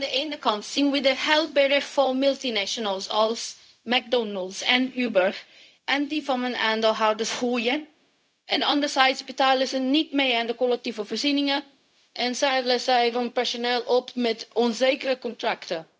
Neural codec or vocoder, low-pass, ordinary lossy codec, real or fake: codec, 16 kHz, 0.4 kbps, LongCat-Audio-Codec; none; none; fake